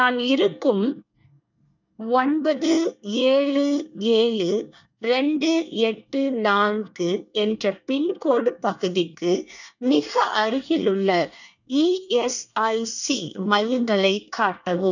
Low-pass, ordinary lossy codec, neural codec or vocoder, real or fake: 7.2 kHz; none; codec, 24 kHz, 1 kbps, SNAC; fake